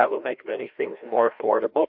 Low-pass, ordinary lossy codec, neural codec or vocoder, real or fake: 5.4 kHz; AAC, 48 kbps; codec, 16 kHz, 1 kbps, FreqCodec, larger model; fake